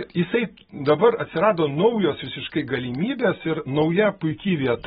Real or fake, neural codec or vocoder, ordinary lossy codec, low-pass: real; none; AAC, 16 kbps; 10.8 kHz